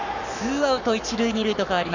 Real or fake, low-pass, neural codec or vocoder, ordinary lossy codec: fake; 7.2 kHz; codec, 44.1 kHz, 7.8 kbps, Pupu-Codec; none